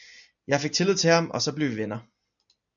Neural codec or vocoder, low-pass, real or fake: none; 7.2 kHz; real